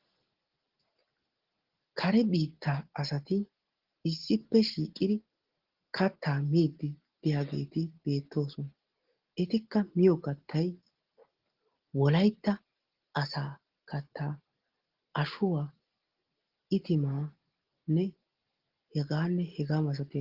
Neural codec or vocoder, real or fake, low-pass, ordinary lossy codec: none; real; 5.4 kHz; Opus, 16 kbps